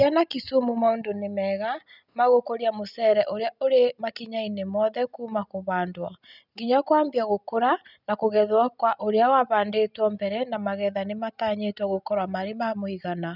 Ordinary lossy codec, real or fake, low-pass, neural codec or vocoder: none; real; 5.4 kHz; none